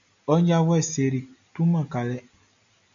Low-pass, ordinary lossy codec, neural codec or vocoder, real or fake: 7.2 kHz; MP3, 64 kbps; none; real